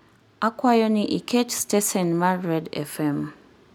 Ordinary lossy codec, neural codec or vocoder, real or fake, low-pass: none; none; real; none